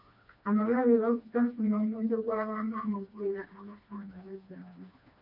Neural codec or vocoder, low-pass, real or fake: codec, 16 kHz, 2 kbps, FreqCodec, smaller model; 5.4 kHz; fake